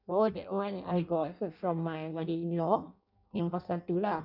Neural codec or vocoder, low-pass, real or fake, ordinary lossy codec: codec, 16 kHz in and 24 kHz out, 0.6 kbps, FireRedTTS-2 codec; 5.4 kHz; fake; none